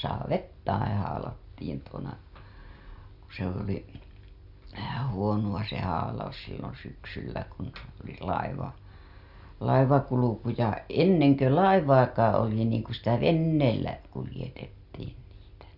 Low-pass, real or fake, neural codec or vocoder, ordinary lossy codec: 5.4 kHz; real; none; Opus, 64 kbps